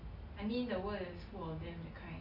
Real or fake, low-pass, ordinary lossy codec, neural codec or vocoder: real; 5.4 kHz; Opus, 64 kbps; none